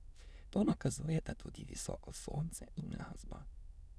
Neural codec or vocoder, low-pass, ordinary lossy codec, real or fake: autoencoder, 22.05 kHz, a latent of 192 numbers a frame, VITS, trained on many speakers; none; none; fake